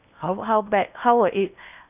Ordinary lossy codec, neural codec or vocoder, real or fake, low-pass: none; codec, 16 kHz in and 24 kHz out, 0.8 kbps, FocalCodec, streaming, 65536 codes; fake; 3.6 kHz